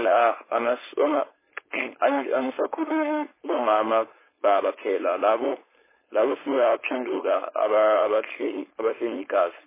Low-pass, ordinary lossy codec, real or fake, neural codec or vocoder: 3.6 kHz; MP3, 16 kbps; fake; codec, 16 kHz, 4.8 kbps, FACodec